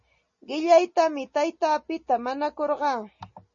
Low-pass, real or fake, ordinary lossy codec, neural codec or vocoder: 7.2 kHz; real; MP3, 32 kbps; none